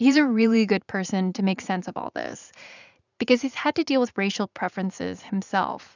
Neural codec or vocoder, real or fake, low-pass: vocoder, 44.1 kHz, 80 mel bands, Vocos; fake; 7.2 kHz